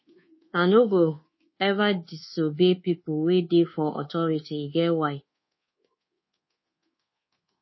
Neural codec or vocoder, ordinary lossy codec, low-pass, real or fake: codec, 24 kHz, 1.2 kbps, DualCodec; MP3, 24 kbps; 7.2 kHz; fake